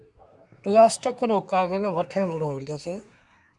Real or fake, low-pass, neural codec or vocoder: fake; 10.8 kHz; codec, 24 kHz, 1 kbps, SNAC